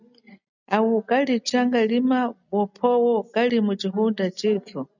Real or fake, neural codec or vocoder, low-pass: real; none; 7.2 kHz